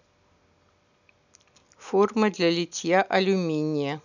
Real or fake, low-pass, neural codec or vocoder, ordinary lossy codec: real; 7.2 kHz; none; none